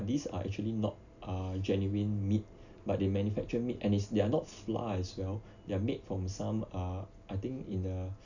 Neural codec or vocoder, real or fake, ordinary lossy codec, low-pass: none; real; none; 7.2 kHz